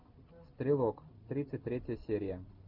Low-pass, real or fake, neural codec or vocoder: 5.4 kHz; real; none